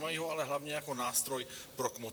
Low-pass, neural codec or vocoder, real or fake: 19.8 kHz; vocoder, 44.1 kHz, 128 mel bands, Pupu-Vocoder; fake